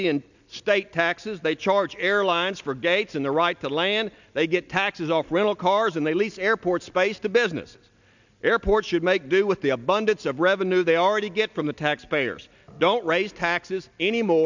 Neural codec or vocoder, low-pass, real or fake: none; 7.2 kHz; real